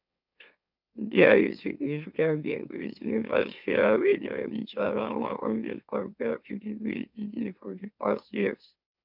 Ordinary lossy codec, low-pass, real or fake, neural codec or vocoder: none; 5.4 kHz; fake; autoencoder, 44.1 kHz, a latent of 192 numbers a frame, MeloTTS